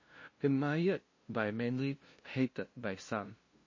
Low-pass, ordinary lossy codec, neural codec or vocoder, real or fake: 7.2 kHz; MP3, 32 kbps; codec, 16 kHz, 0.5 kbps, FunCodec, trained on LibriTTS, 25 frames a second; fake